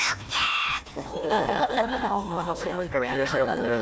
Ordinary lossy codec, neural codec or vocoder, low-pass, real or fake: none; codec, 16 kHz, 1 kbps, FunCodec, trained on Chinese and English, 50 frames a second; none; fake